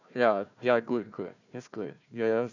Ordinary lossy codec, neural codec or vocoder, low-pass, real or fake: none; codec, 16 kHz, 1 kbps, FunCodec, trained on Chinese and English, 50 frames a second; 7.2 kHz; fake